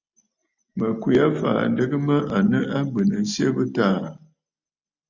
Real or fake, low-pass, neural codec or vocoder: real; 7.2 kHz; none